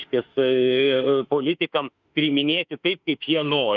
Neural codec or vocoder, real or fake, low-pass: autoencoder, 48 kHz, 32 numbers a frame, DAC-VAE, trained on Japanese speech; fake; 7.2 kHz